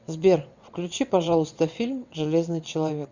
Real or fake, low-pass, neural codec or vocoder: fake; 7.2 kHz; vocoder, 22.05 kHz, 80 mel bands, WaveNeXt